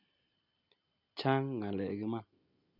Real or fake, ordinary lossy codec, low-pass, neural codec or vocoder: real; none; 5.4 kHz; none